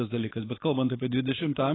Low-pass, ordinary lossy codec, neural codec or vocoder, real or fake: 7.2 kHz; AAC, 16 kbps; codec, 16 kHz, 4.8 kbps, FACodec; fake